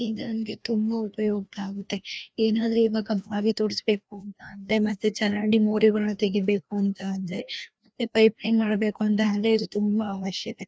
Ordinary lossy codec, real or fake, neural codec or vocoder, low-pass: none; fake; codec, 16 kHz, 1 kbps, FreqCodec, larger model; none